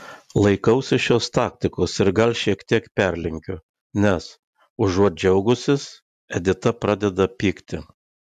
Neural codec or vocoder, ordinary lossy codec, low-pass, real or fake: none; AAC, 96 kbps; 14.4 kHz; real